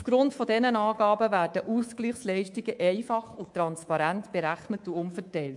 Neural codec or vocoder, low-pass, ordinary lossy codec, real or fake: codec, 24 kHz, 3.1 kbps, DualCodec; 10.8 kHz; MP3, 64 kbps; fake